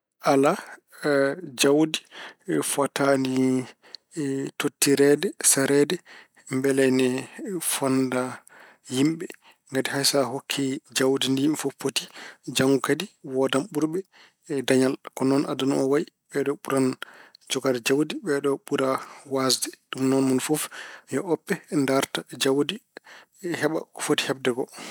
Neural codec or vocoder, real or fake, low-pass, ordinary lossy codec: none; real; none; none